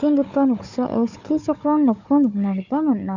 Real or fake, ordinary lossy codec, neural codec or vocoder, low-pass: fake; none; codec, 16 kHz, 4 kbps, FunCodec, trained on Chinese and English, 50 frames a second; 7.2 kHz